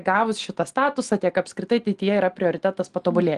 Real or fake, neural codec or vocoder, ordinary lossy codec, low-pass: real; none; Opus, 24 kbps; 10.8 kHz